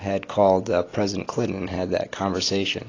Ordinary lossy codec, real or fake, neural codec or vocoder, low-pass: AAC, 32 kbps; real; none; 7.2 kHz